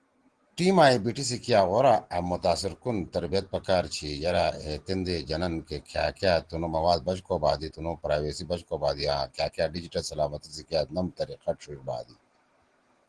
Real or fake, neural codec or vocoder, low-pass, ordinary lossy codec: real; none; 9.9 kHz; Opus, 16 kbps